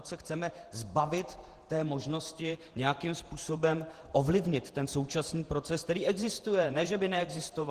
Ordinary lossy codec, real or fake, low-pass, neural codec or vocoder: Opus, 16 kbps; fake; 14.4 kHz; vocoder, 48 kHz, 128 mel bands, Vocos